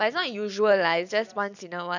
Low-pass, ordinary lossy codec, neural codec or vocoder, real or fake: 7.2 kHz; none; vocoder, 44.1 kHz, 128 mel bands every 256 samples, BigVGAN v2; fake